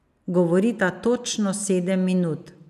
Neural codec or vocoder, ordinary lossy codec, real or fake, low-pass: none; none; real; 14.4 kHz